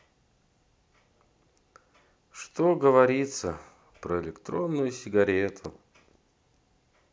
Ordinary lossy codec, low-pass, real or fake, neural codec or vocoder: none; none; real; none